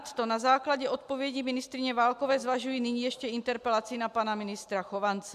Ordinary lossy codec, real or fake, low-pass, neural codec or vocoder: AAC, 96 kbps; real; 14.4 kHz; none